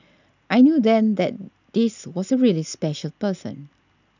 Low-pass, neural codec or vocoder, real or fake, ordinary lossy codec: 7.2 kHz; none; real; none